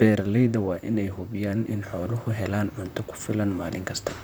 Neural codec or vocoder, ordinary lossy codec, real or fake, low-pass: vocoder, 44.1 kHz, 128 mel bands, Pupu-Vocoder; none; fake; none